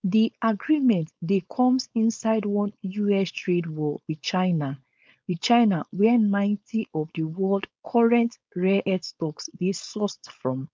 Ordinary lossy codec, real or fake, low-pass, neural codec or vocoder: none; fake; none; codec, 16 kHz, 4.8 kbps, FACodec